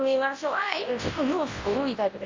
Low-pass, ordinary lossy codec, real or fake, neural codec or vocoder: 7.2 kHz; Opus, 32 kbps; fake; codec, 24 kHz, 0.9 kbps, WavTokenizer, large speech release